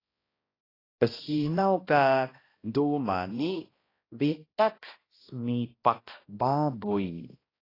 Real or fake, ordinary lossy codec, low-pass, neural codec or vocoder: fake; AAC, 24 kbps; 5.4 kHz; codec, 16 kHz, 1 kbps, X-Codec, HuBERT features, trained on balanced general audio